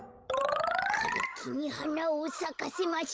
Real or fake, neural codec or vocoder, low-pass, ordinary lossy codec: fake; codec, 16 kHz, 16 kbps, FreqCodec, larger model; none; none